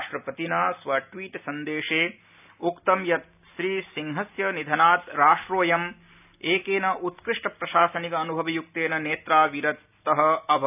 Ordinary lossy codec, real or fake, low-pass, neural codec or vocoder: none; real; 3.6 kHz; none